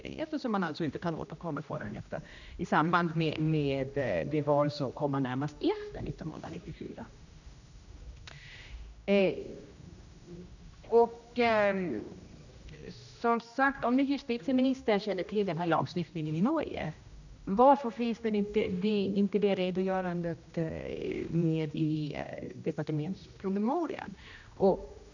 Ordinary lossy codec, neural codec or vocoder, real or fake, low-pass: none; codec, 16 kHz, 1 kbps, X-Codec, HuBERT features, trained on general audio; fake; 7.2 kHz